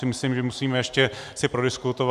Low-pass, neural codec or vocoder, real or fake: 14.4 kHz; none; real